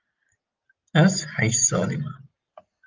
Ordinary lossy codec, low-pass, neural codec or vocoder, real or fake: Opus, 24 kbps; 7.2 kHz; none; real